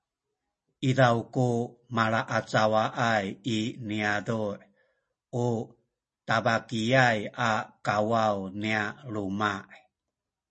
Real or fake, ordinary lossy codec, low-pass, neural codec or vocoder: real; MP3, 32 kbps; 10.8 kHz; none